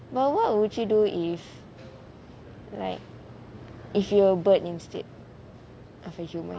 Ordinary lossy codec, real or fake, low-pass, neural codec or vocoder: none; real; none; none